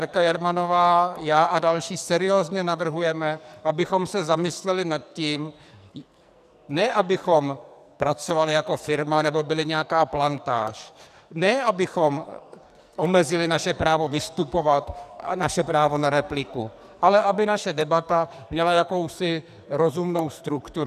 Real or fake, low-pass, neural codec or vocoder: fake; 14.4 kHz; codec, 44.1 kHz, 2.6 kbps, SNAC